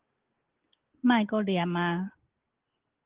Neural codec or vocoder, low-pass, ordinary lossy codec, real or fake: none; 3.6 kHz; Opus, 16 kbps; real